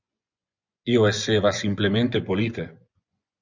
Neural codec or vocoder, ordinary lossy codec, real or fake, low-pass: vocoder, 24 kHz, 100 mel bands, Vocos; Opus, 64 kbps; fake; 7.2 kHz